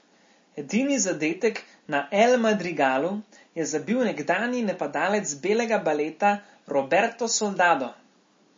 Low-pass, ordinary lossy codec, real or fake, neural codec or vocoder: 7.2 kHz; MP3, 32 kbps; real; none